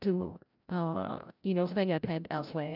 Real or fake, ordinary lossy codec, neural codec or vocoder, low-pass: fake; none; codec, 16 kHz, 0.5 kbps, FreqCodec, larger model; 5.4 kHz